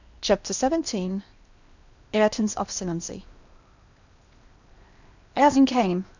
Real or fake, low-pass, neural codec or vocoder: fake; 7.2 kHz; codec, 16 kHz in and 24 kHz out, 0.8 kbps, FocalCodec, streaming, 65536 codes